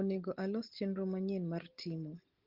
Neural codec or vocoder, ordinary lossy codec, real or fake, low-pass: none; Opus, 16 kbps; real; 5.4 kHz